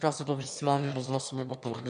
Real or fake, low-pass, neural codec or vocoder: fake; 9.9 kHz; autoencoder, 22.05 kHz, a latent of 192 numbers a frame, VITS, trained on one speaker